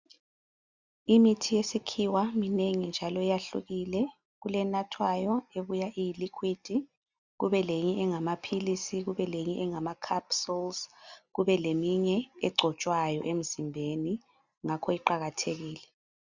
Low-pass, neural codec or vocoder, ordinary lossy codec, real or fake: 7.2 kHz; none; Opus, 64 kbps; real